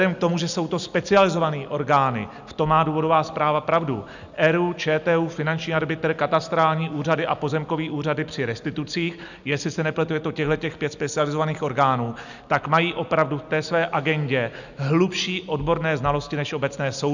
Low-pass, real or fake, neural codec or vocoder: 7.2 kHz; real; none